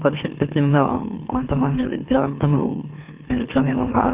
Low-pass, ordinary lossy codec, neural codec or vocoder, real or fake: 3.6 kHz; Opus, 32 kbps; autoencoder, 44.1 kHz, a latent of 192 numbers a frame, MeloTTS; fake